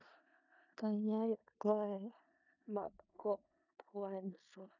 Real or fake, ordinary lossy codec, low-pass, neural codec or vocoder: fake; none; 7.2 kHz; codec, 16 kHz in and 24 kHz out, 0.4 kbps, LongCat-Audio-Codec, four codebook decoder